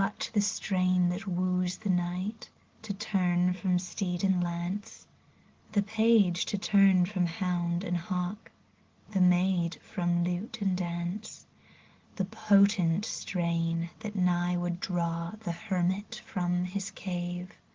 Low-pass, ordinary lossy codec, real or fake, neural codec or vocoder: 7.2 kHz; Opus, 16 kbps; real; none